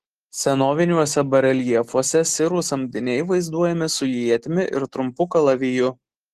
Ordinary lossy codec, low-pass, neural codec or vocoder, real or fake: Opus, 16 kbps; 14.4 kHz; none; real